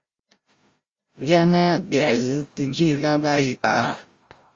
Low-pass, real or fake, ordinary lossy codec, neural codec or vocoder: 7.2 kHz; fake; Opus, 32 kbps; codec, 16 kHz, 0.5 kbps, FreqCodec, larger model